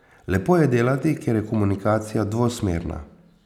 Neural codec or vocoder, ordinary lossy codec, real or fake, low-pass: none; none; real; 19.8 kHz